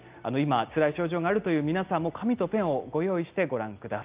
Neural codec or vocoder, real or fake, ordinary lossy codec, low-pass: none; real; Opus, 32 kbps; 3.6 kHz